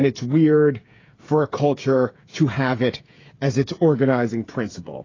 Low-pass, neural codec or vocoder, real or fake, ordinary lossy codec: 7.2 kHz; codec, 16 kHz, 8 kbps, FreqCodec, smaller model; fake; AAC, 32 kbps